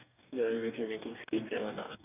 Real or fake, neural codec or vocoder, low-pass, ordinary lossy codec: fake; codec, 44.1 kHz, 2.6 kbps, DAC; 3.6 kHz; none